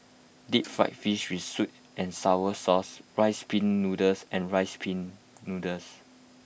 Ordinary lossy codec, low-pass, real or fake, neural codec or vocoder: none; none; real; none